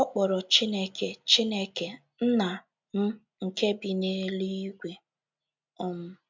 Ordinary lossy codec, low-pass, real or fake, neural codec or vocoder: MP3, 64 kbps; 7.2 kHz; real; none